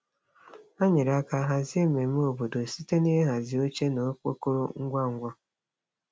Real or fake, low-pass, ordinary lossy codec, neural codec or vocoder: real; none; none; none